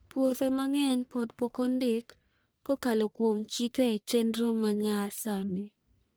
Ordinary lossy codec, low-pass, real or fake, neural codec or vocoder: none; none; fake; codec, 44.1 kHz, 1.7 kbps, Pupu-Codec